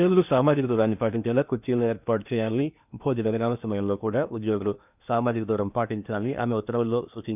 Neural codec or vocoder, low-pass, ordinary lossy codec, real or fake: codec, 16 kHz in and 24 kHz out, 0.8 kbps, FocalCodec, streaming, 65536 codes; 3.6 kHz; none; fake